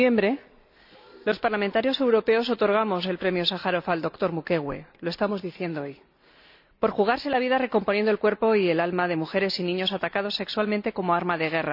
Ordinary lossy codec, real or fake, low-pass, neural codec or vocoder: none; real; 5.4 kHz; none